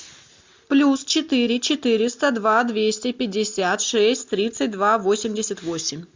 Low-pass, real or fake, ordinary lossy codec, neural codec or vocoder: 7.2 kHz; real; MP3, 64 kbps; none